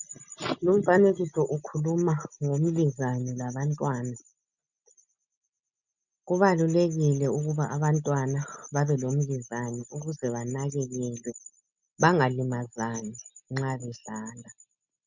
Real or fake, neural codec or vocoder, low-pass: real; none; 7.2 kHz